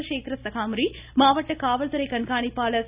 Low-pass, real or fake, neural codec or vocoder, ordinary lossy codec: 3.6 kHz; real; none; Opus, 64 kbps